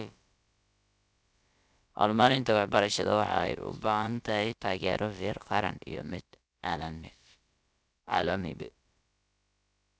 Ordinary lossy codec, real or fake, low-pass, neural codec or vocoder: none; fake; none; codec, 16 kHz, about 1 kbps, DyCAST, with the encoder's durations